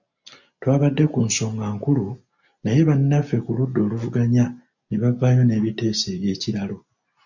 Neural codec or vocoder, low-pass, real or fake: none; 7.2 kHz; real